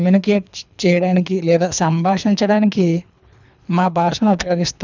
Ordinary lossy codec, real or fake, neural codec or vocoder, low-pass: none; fake; codec, 24 kHz, 3 kbps, HILCodec; 7.2 kHz